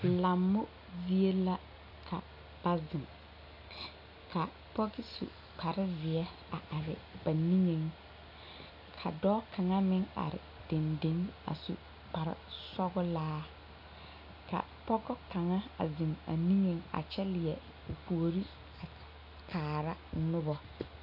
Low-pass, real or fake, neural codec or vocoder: 5.4 kHz; real; none